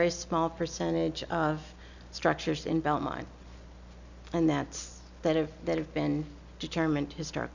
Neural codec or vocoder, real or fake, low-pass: none; real; 7.2 kHz